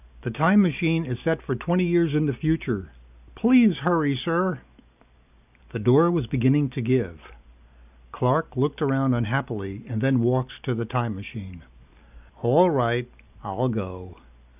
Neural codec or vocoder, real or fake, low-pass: none; real; 3.6 kHz